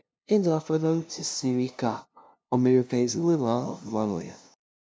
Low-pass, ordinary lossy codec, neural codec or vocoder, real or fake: none; none; codec, 16 kHz, 0.5 kbps, FunCodec, trained on LibriTTS, 25 frames a second; fake